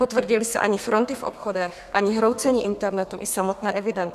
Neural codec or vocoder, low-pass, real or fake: codec, 32 kHz, 1.9 kbps, SNAC; 14.4 kHz; fake